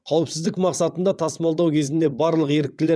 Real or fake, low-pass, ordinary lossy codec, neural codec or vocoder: fake; none; none; vocoder, 22.05 kHz, 80 mel bands, WaveNeXt